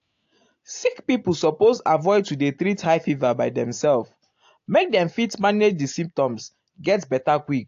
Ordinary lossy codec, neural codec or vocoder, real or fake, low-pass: AAC, 48 kbps; none; real; 7.2 kHz